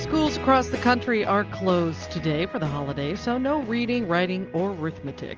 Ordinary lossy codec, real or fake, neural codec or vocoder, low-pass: Opus, 24 kbps; real; none; 7.2 kHz